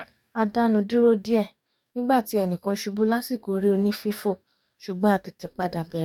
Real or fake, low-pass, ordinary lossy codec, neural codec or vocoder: fake; 19.8 kHz; none; codec, 44.1 kHz, 2.6 kbps, DAC